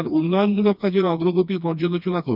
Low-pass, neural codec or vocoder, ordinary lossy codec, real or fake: 5.4 kHz; codec, 16 kHz, 2 kbps, FreqCodec, smaller model; none; fake